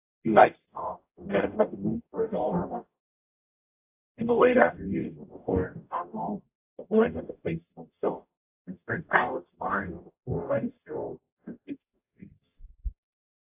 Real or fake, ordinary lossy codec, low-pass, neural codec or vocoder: fake; AAC, 24 kbps; 3.6 kHz; codec, 44.1 kHz, 0.9 kbps, DAC